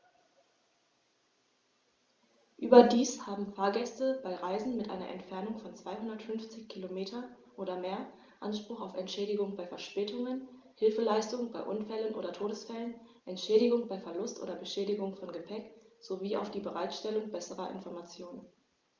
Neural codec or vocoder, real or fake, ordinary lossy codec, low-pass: none; real; Opus, 32 kbps; 7.2 kHz